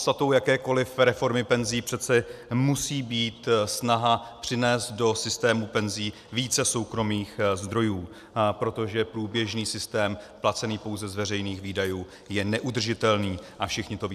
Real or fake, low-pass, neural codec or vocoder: real; 14.4 kHz; none